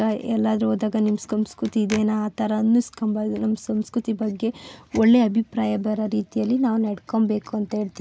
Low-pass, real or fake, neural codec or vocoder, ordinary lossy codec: none; real; none; none